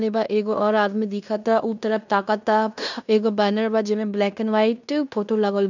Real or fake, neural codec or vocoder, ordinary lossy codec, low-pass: fake; codec, 16 kHz in and 24 kHz out, 0.9 kbps, LongCat-Audio-Codec, fine tuned four codebook decoder; none; 7.2 kHz